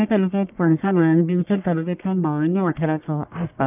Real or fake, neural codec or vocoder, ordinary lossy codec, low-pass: fake; codec, 44.1 kHz, 1.7 kbps, Pupu-Codec; none; 3.6 kHz